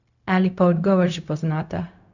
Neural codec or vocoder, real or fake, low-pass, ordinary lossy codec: codec, 16 kHz, 0.4 kbps, LongCat-Audio-Codec; fake; 7.2 kHz; none